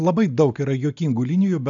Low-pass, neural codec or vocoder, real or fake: 7.2 kHz; none; real